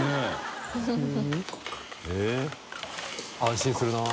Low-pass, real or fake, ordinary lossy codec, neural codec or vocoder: none; real; none; none